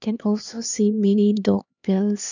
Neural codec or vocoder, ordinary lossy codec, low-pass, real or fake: codec, 16 kHz, 2 kbps, X-Codec, HuBERT features, trained on LibriSpeech; none; 7.2 kHz; fake